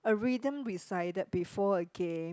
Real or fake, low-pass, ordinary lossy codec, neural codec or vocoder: real; none; none; none